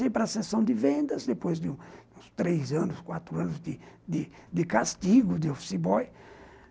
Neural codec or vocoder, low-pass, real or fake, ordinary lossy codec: none; none; real; none